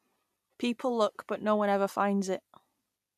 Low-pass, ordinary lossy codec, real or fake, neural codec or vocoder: 14.4 kHz; none; real; none